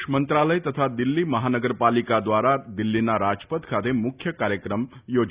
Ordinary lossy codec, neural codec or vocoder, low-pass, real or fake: Opus, 64 kbps; none; 3.6 kHz; real